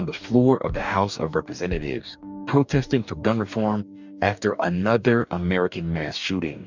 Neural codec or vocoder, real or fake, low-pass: codec, 44.1 kHz, 2.6 kbps, DAC; fake; 7.2 kHz